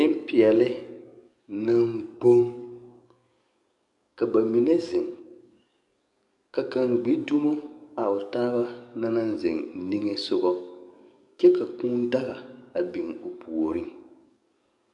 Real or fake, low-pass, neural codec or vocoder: fake; 10.8 kHz; codec, 44.1 kHz, 7.8 kbps, DAC